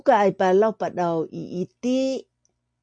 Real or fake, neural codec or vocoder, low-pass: real; none; 9.9 kHz